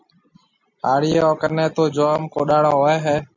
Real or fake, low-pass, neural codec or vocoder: real; 7.2 kHz; none